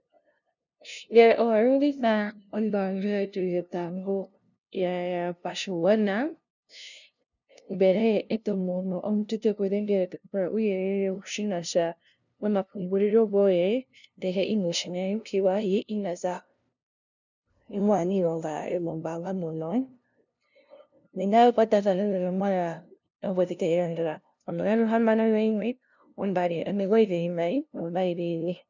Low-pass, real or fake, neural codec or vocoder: 7.2 kHz; fake; codec, 16 kHz, 0.5 kbps, FunCodec, trained on LibriTTS, 25 frames a second